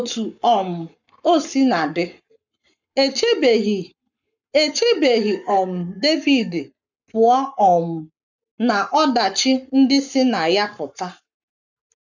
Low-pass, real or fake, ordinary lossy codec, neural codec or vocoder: 7.2 kHz; fake; none; codec, 44.1 kHz, 7.8 kbps, Pupu-Codec